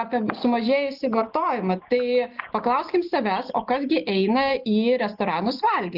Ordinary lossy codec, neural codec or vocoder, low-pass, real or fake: Opus, 32 kbps; none; 5.4 kHz; real